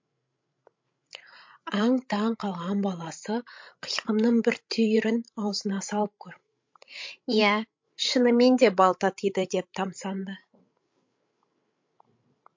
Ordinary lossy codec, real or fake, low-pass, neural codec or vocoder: MP3, 48 kbps; fake; 7.2 kHz; codec, 16 kHz, 16 kbps, FreqCodec, larger model